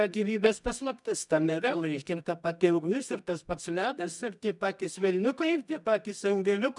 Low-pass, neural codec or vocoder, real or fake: 10.8 kHz; codec, 24 kHz, 0.9 kbps, WavTokenizer, medium music audio release; fake